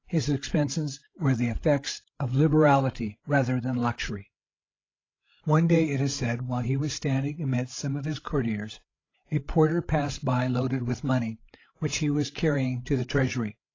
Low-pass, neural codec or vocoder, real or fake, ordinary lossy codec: 7.2 kHz; codec, 16 kHz, 16 kbps, FreqCodec, larger model; fake; AAC, 32 kbps